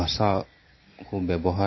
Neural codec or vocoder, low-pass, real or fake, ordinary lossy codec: none; 7.2 kHz; real; MP3, 24 kbps